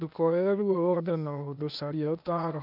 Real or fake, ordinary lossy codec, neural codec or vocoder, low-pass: fake; none; codec, 16 kHz, 0.8 kbps, ZipCodec; 5.4 kHz